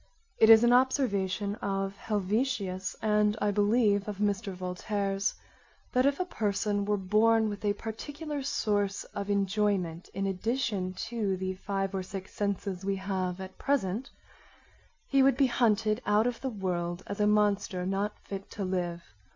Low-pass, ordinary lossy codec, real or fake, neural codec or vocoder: 7.2 kHz; MP3, 64 kbps; real; none